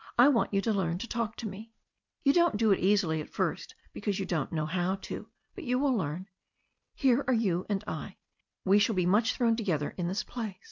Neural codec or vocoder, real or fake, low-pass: none; real; 7.2 kHz